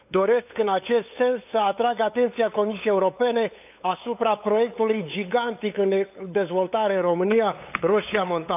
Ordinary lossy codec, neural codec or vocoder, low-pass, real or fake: none; codec, 16 kHz, 8 kbps, FunCodec, trained on LibriTTS, 25 frames a second; 3.6 kHz; fake